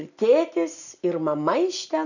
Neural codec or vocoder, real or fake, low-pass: none; real; 7.2 kHz